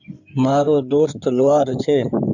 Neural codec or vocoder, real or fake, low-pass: codec, 16 kHz in and 24 kHz out, 2.2 kbps, FireRedTTS-2 codec; fake; 7.2 kHz